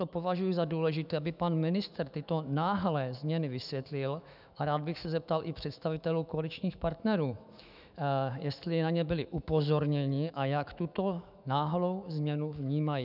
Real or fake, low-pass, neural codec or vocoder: fake; 5.4 kHz; autoencoder, 48 kHz, 128 numbers a frame, DAC-VAE, trained on Japanese speech